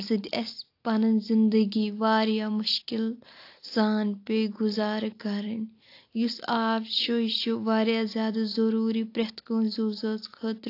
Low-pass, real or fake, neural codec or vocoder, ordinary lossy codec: 5.4 kHz; real; none; AAC, 32 kbps